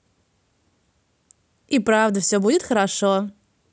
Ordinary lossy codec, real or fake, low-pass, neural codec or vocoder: none; real; none; none